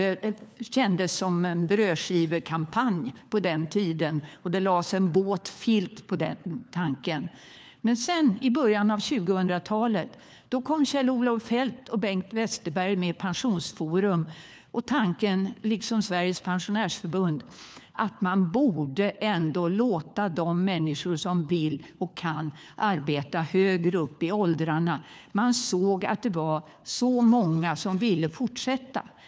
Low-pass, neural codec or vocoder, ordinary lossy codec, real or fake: none; codec, 16 kHz, 4 kbps, FunCodec, trained on LibriTTS, 50 frames a second; none; fake